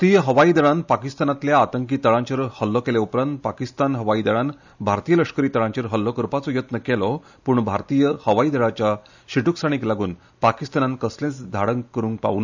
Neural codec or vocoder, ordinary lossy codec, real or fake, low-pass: none; none; real; 7.2 kHz